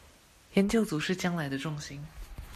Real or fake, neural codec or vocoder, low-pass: real; none; 14.4 kHz